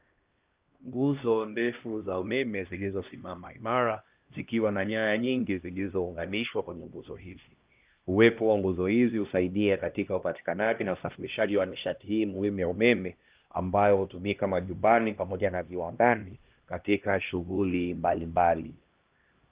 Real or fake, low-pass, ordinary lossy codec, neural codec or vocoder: fake; 3.6 kHz; Opus, 32 kbps; codec, 16 kHz, 1 kbps, X-Codec, HuBERT features, trained on LibriSpeech